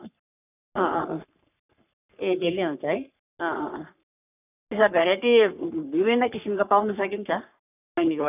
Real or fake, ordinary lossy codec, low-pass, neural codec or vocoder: fake; none; 3.6 kHz; codec, 44.1 kHz, 3.4 kbps, Pupu-Codec